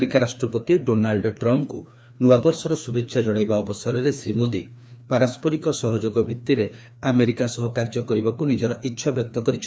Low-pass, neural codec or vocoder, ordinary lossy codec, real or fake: none; codec, 16 kHz, 2 kbps, FreqCodec, larger model; none; fake